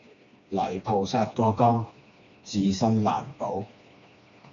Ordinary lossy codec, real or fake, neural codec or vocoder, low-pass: AAC, 64 kbps; fake; codec, 16 kHz, 2 kbps, FreqCodec, smaller model; 7.2 kHz